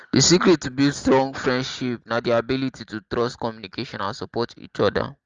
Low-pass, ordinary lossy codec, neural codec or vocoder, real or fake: 7.2 kHz; Opus, 32 kbps; none; real